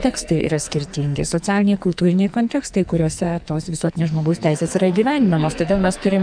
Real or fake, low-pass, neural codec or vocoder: fake; 9.9 kHz; codec, 44.1 kHz, 2.6 kbps, SNAC